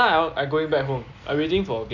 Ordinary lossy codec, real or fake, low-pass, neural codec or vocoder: none; real; 7.2 kHz; none